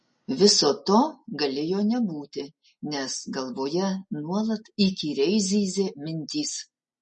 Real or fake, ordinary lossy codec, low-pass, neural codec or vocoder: real; MP3, 32 kbps; 10.8 kHz; none